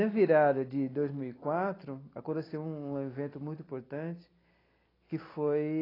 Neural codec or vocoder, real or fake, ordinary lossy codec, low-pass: none; real; AAC, 24 kbps; 5.4 kHz